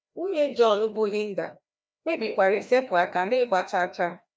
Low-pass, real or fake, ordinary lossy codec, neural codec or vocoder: none; fake; none; codec, 16 kHz, 1 kbps, FreqCodec, larger model